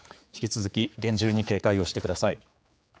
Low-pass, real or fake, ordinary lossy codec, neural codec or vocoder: none; fake; none; codec, 16 kHz, 4 kbps, X-Codec, HuBERT features, trained on general audio